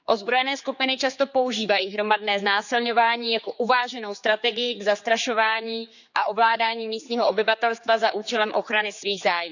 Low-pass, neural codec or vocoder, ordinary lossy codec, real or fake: 7.2 kHz; codec, 16 kHz, 4 kbps, X-Codec, HuBERT features, trained on general audio; none; fake